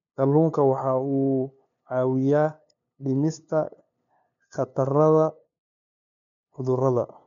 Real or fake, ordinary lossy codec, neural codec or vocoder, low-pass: fake; none; codec, 16 kHz, 2 kbps, FunCodec, trained on LibriTTS, 25 frames a second; 7.2 kHz